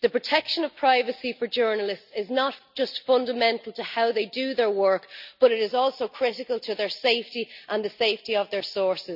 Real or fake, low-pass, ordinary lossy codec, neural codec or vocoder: real; 5.4 kHz; none; none